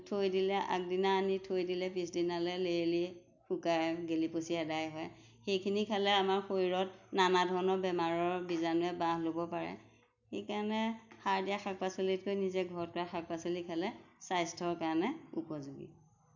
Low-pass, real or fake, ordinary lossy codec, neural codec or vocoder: 7.2 kHz; real; none; none